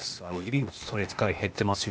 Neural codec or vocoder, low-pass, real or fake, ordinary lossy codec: codec, 16 kHz, 0.8 kbps, ZipCodec; none; fake; none